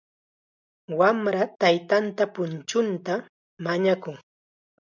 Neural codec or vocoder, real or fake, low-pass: none; real; 7.2 kHz